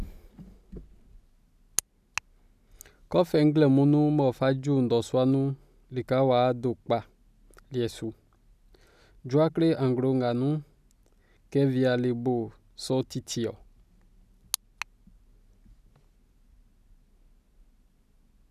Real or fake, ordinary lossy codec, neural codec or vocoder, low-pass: real; none; none; 14.4 kHz